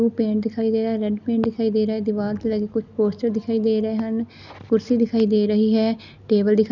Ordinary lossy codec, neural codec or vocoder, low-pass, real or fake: none; none; 7.2 kHz; real